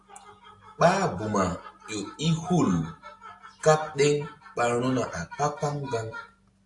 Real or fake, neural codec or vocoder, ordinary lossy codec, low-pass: real; none; AAC, 64 kbps; 10.8 kHz